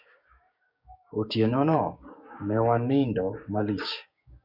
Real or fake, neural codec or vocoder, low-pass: fake; codec, 16 kHz, 6 kbps, DAC; 5.4 kHz